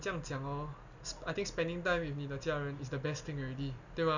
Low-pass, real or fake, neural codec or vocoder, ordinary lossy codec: 7.2 kHz; real; none; none